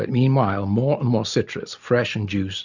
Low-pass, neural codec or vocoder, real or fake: 7.2 kHz; none; real